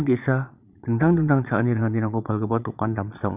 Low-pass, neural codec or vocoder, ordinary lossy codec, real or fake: 3.6 kHz; vocoder, 22.05 kHz, 80 mel bands, Vocos; none; fake